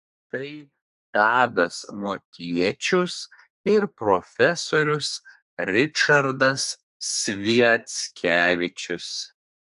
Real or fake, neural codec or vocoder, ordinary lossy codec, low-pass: fake; codec, 24 kHz, 1 kbps, SNAC; AAC, 96 kbps; 10.8 kHz